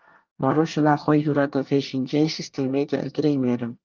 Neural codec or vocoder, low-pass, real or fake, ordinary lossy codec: codec, 24 kHz, 1 kbps, SNAC; 7.2 kHz; fake; Opus, 32 kbps